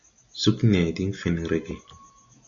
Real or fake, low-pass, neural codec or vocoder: real; 7.2 kHz; none